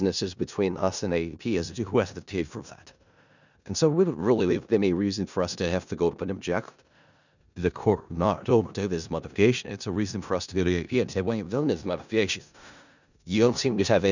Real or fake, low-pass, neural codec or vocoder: fake; 7.2 kHz; codec, 16 kHz in and 24 kHz out, 0.4 kbps, LongCat-Audio-Codec, four codebook decoder